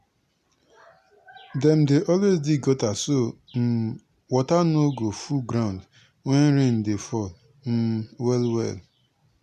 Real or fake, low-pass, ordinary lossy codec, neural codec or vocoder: real; 14.4 kHz; none; none